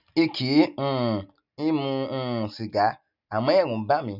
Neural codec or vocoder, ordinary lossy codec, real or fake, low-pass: none; none; real; 5.4 kHz